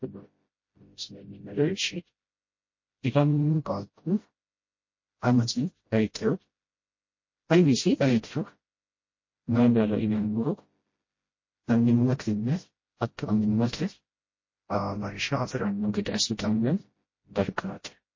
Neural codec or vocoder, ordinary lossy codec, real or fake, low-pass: codec, 16 kHz, 0.5 kbps, FreqCodec, smaller model; MP3, 32 kbps; fake; 7.2 kHz